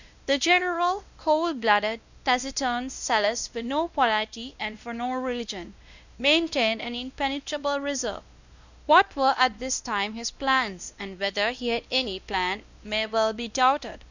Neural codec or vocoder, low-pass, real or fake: codec, 16 kHz, 1 kbps, X-Codec, WavLM features, trained on Multilingual LibriSpeech; 7.2 kHz; fake